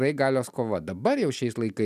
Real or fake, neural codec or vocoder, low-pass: real; none; 14.4 kHz